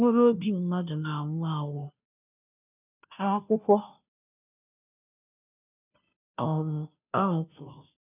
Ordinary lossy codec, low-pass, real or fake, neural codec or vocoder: none; 3.6 kHz; fake; codec, 16 kHz, 0.5 kbps, FunCodec, trained on Chinese and English, 25 frames a second